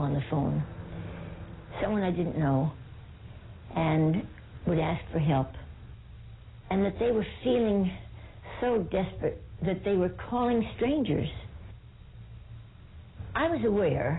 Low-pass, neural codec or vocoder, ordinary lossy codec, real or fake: 7.2 kHz; none; AAC, 16 kbps; real